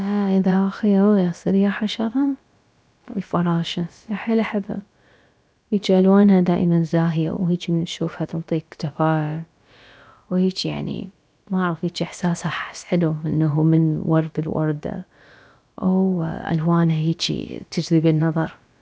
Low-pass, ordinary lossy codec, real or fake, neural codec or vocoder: none; none; fake; codec, 16 kHz, about 1 kbps, DyCAST, with the encoder's durations